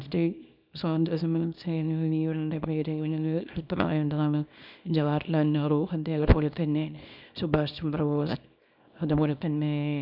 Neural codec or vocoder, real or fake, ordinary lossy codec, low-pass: codec, 24 kHz, 0.9 kbps, WavTokenizer, small release; fake; none; 5.4 kHz